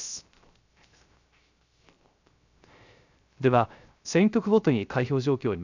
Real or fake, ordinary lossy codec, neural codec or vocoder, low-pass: fake; none; codec, 16 kHz, 0.3 kbps, FocalCodec; 7.2 kHz